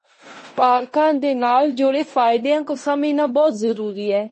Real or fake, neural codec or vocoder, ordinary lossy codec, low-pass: fake; codec, 16 kHz in and 24 kHz out, 0.9 kbps, LongCat-Audio-Codec, fine tuned four codebook decoder; MP3, 32 kbps; 10.8 kHz